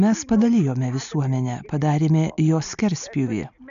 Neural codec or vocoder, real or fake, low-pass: none; real; 7.2 kHz